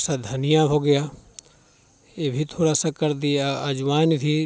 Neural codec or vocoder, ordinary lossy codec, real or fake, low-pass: none; none; real; none